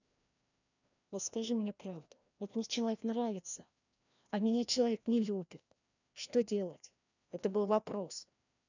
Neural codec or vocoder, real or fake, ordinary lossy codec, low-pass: codec, 16 kHz, 1 kbps, FreqCodec, larger model; fake; none; 7.2 kHz